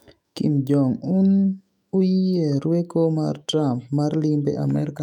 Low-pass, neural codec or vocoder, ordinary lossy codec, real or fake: 19.8 kHz; autoencoder, 48 kHz, 128 numbers a frame, DAC-VAE, trained on Japanese speech; none; fake